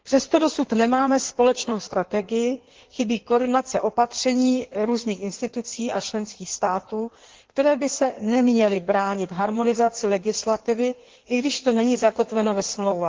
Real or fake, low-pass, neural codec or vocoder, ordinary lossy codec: fake; 7.2 kHz; codec, 16 kHz in and 24 kHz out, 1.1 kbps, FireRedTTS-2 codec; Opus, 16 kbps